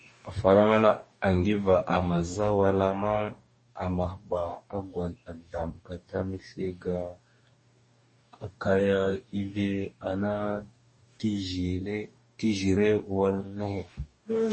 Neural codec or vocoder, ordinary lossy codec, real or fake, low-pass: codec, 44.1 kHz, 2.6 kbps, DAC; MP3, 32 kbps; fake; 9.9 kHz